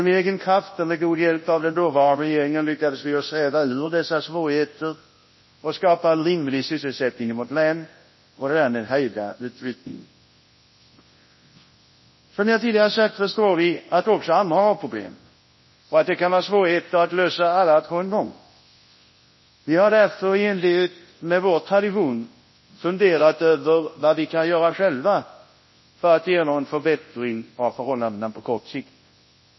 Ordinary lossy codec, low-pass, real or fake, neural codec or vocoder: MP3, 24 kbps; 7.2 kHz; fake; codec, 24 kHz, 0.9 kbps, WavTokenizer, large speech release